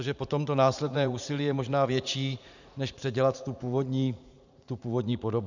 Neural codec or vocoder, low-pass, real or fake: vocoder, 44.1 kHz, 80 mel bands, Vocos; 7.2 kHz; fake